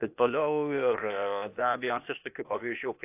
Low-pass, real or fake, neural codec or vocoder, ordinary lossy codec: 3.6 kHz; fake; codec, 16 kHz, 0.8 kbps, ZipCodec; AAC, 32 kbps